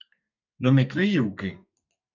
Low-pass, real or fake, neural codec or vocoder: 7.2 kHz; fake; codec, 32 kHz, 1.9 kbps, SNAC